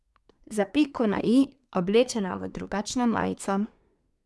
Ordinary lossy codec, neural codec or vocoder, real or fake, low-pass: none; codec, 24 kHz, 1 kbps, SNAC; fake; none